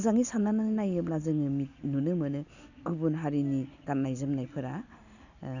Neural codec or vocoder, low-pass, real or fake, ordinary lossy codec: none; 7.2 kHz; real; none